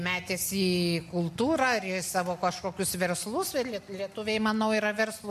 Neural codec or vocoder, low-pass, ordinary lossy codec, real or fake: none; 14.4 kHz; MP3, 64 kbps; real